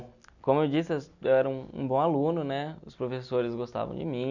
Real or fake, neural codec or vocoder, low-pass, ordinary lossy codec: real; none; 7.2 kHz; none